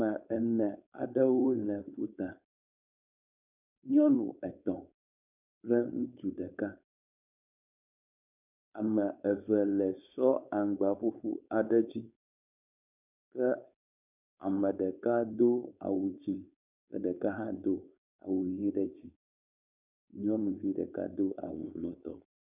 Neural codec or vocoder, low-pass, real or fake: codec, 16 kHz, 4.8 kbps, FACodec; 3.6 kHz; fake